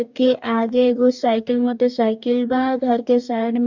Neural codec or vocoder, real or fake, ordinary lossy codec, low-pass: codec, 44.1 kHz, 2.6 kbps, DAC; fake; none; 7.2 kHz